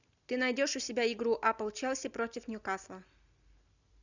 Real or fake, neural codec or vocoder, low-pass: real; none; 7.2 kHz